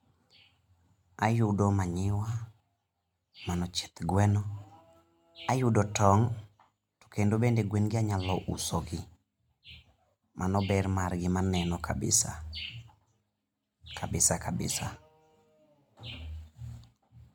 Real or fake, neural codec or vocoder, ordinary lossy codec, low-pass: real; none; MP3, 96 kbps; 19.8 kHz